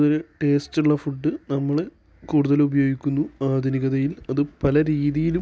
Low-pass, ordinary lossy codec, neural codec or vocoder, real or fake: none; none; none; real